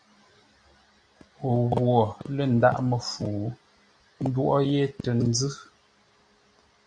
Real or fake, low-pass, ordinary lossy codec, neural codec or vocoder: real; 9.9 kHz; Opus, 64 kbps; none